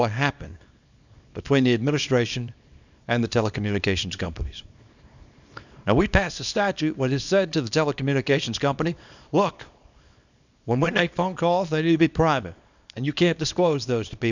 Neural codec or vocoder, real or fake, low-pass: codec, 24 kHz, 0.9 kbps, WavTokenizer, small release; fake; 7.2 kHz